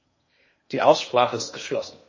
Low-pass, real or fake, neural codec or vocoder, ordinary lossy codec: 7.2 kHz; fake; codec, 16 kHz in and 24 kHz out, 0.8 kbps, FocalCodec, streaming, 65536 codes; MP3, 32 kbps